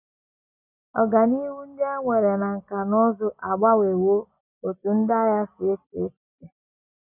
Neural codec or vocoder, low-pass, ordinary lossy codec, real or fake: none; 3.6 kHz; none; real